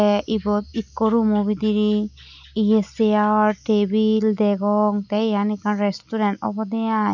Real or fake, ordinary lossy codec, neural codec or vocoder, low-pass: real; none; none; 7.2 kHz